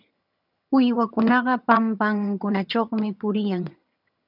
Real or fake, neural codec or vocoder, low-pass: fake; vocoder, 22.05 kHz, 80 mel bands, HiFi-GAN; 5.4 kHz